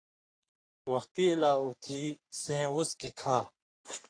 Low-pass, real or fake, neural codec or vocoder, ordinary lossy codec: 9.9 kHz; fake; codec, 44.1 kHz, 3.4 kbps, Pupu-Codec; AAC, 48 kbps